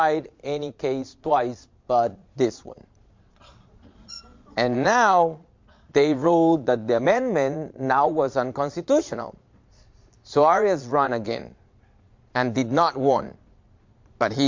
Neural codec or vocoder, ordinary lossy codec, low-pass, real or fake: vocoder, 22.05 kHz, 80 mel bands, Vocos; MP3, 48 kbps; 7.2 kHz; fake